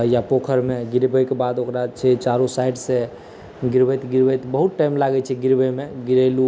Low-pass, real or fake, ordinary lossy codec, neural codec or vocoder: none; real; none; none